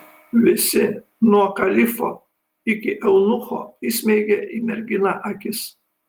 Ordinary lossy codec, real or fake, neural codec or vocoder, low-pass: Opus, 24 kbps; real; none; 19.8 kHz